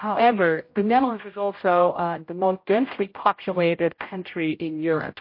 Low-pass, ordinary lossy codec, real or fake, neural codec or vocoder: 5.4 kHz; MP3, 32 kbps; fake; codec, 16 kHz, 0.5 kbps, X-Codec, HuBERT features, trained on general audio